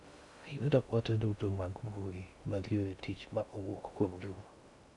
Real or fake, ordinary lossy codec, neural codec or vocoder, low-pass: fake; none; codec, 16 kHz in and 24 kHz out, 0.6 kbps, FocalCodec, streaming, 2048 codes; 10.8 kHz